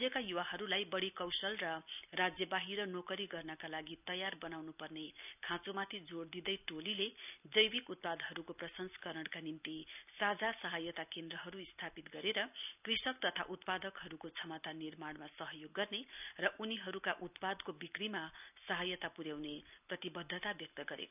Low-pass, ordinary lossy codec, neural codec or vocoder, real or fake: 3.6 kHz; none; none; real